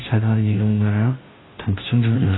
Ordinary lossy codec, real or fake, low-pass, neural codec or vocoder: AAC, 16 kbps; fake; 7.2 kHz; codec, 16 kHz, 0.5 kbps, FunCodec, trained on Chinese and English, 25 frames a second